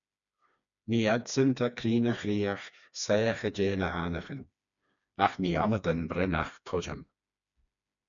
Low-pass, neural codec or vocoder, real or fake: 7.2 kHz; codec, 16 kHz, 2 kbps, FreqCodec, smaller model; fake